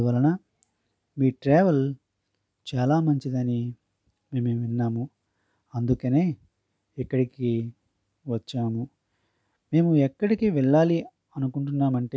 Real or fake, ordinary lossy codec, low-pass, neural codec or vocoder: real; none; none; none